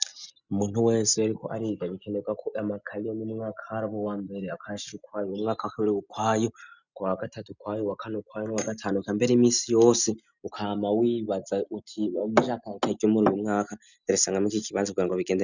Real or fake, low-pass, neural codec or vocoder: real; 7.2 kHz; none